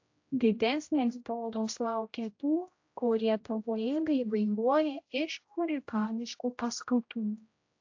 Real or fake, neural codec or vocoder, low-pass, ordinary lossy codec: fake; codec, 16 kHz, 0.5 kbps, X-Codec, HuBERT features, trained on general audio; 7.2 kHz; AAC, 48 kbps